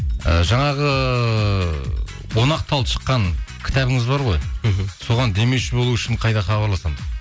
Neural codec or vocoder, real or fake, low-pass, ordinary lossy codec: none; real; none; none